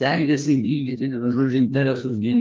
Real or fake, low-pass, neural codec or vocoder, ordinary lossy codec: fake; 7.2 kHz; codec, 16 kHz, 1 kbps, FreqCodec, larger model; Opus, 24 kbps